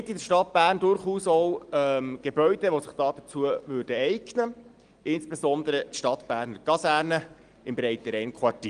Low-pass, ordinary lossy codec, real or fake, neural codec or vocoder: 9.9 kHz; Opus, 24 kbps; real; none